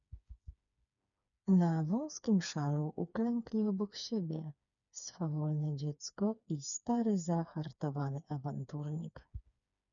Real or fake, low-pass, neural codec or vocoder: fake; 7.2 kHz; codec, 16 kHz, 4 kbps, FreqCodec, smaller model